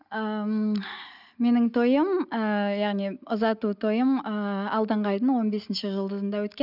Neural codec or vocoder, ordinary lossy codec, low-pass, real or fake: none; none; 5.4 kHz; real